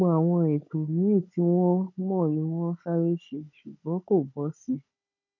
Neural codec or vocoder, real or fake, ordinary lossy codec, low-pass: codec, 16 kHz, 16 kbps, FunCodec, trained on Chinese and English, 50 frames a second; fake; none; 7.2 kHz